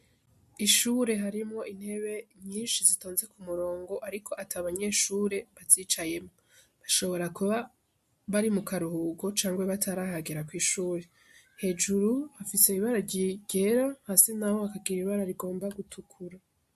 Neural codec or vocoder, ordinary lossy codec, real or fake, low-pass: none; MP3, 64 kbps; real; 14.4 kHz